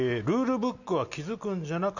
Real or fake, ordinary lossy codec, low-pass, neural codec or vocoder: real; none; 7.2 kHz; none